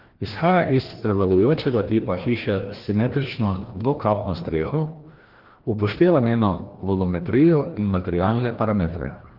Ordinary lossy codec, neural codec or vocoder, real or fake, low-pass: Opus, 16 kbps; codec, 16 kHz, 1 kbps, FreqCodec, larger model; fake; 5.4 kHz